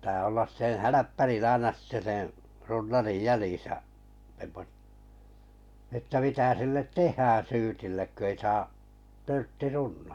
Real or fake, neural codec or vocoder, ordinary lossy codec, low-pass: real; none; none; 19.8 kHz